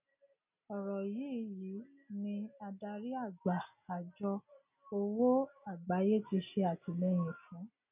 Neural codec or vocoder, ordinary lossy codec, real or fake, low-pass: none; none; real; 3.6 kHz